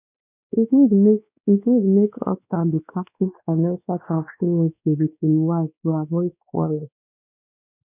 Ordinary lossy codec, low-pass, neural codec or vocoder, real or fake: none; 3.6 kHz; codec, 16 kHz, 2 kbps, X-Codec, WavLM features, trained on Multilingual LibriSpeech; fake